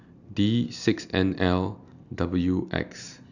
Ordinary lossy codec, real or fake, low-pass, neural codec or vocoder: none; real; 7.2 kHz; none